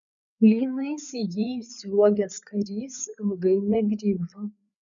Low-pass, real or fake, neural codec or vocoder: 7.2 kHz; fake; codec, 16 kHz, 4 kbps, FreqCodec, larger model